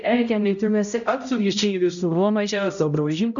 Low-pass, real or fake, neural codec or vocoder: 7.2 kHz; fake; codec, 16 kHz, 0.5 kbps, X-Codec, HuBERT features, trained on balanced general audio